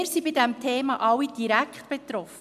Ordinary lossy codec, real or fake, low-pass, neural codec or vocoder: MP3, 96 kbps; real; 14.4 kHz; none